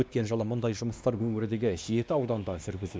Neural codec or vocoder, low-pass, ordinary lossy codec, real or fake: codec, 16 kHz, 2 kbps, X-Codec, WavLM features, trained on Multilingual LibriSpeech; none; none; fake